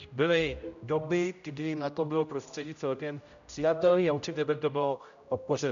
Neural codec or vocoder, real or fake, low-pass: codec, 16 kHz, 0.5 kbps, X-Codec, HuBERT features, trained on general audio; fake; 7.2 kHz